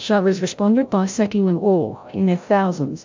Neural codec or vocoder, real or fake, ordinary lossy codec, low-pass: codec, 16 kHz, 0.5 kbps, FreqCodec, larger model; fake; MP3, 64 kbps; 7.2 kHz